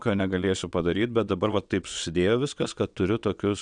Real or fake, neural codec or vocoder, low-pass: fake; vocoder, 22.05 kHz, 80 mel bands, WaveNeXt; 9.9 kHz